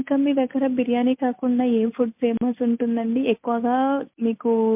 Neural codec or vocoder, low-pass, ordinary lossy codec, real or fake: none; 3.6 kHz; MP3, 32 kbps; real